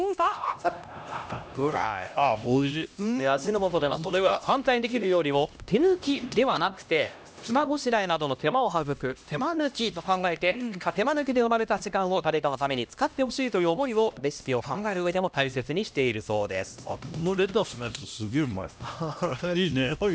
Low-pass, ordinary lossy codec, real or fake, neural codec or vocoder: none; none; fake; codec, 16 kHz, 1 kbps, X-Codec, HuBERT features, trained on LibriSpeech